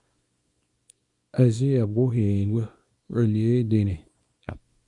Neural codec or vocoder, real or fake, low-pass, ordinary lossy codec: codec, 24 kHz, 0.9 kbps, WavTokenizer, small release; fake; 10.8 kHz; Opus, 64 kbps